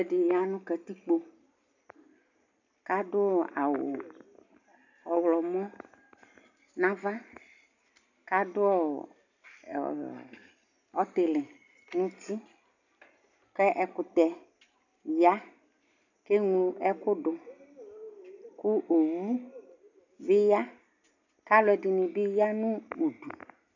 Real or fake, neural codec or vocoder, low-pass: real; none; 7.2 kHz